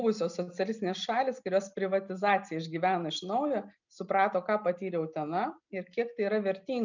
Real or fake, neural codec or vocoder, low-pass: real; none; 7.2 kHz